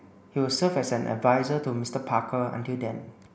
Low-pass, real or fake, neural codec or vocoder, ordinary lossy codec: none; real; none; none